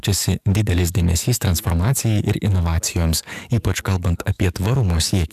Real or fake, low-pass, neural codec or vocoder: fake; 14.4 kHz; codec, 44.1 kHz, 7.8 kbps, DAC